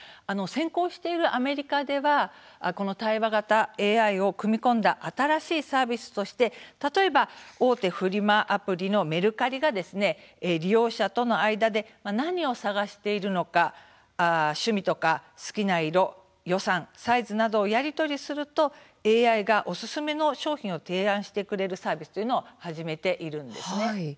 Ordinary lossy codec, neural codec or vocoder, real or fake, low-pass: none; none; real; none